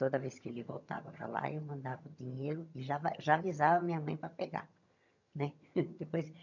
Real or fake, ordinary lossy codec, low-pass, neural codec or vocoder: fake; none; 7.2 kHz; vocoder, 22.05 kHz, 80 mel bands, HiFi-GAN